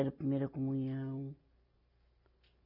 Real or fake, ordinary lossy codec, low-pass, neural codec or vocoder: real; none; 5.4 kHz; none